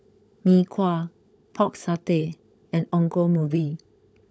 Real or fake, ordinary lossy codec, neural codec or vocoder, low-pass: fake; none; codec, 16 kHz, 16 kbps, FunCodec, trained on LibriTTS, 50 frames a second; none